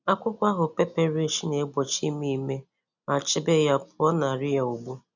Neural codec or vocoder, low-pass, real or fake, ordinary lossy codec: none; 7.2 kHz; real; none